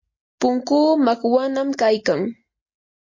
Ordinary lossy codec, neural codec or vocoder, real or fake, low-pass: MP3, 32 kbps; none; real; 7.2 kHz